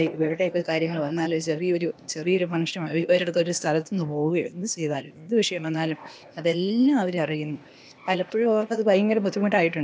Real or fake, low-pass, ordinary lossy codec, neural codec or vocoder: fake; none; none; codec, 16 kHz, 0.8 kbps, ZipCodec